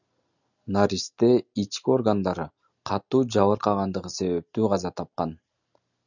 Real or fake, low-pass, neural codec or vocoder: real; 7.2 kHz; none